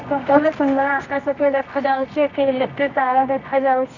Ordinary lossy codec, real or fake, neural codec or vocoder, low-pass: none; fake; codec, 24 kHz, 0.9 kbps, WavTokenizer, medium music audio release; 7.2 kHz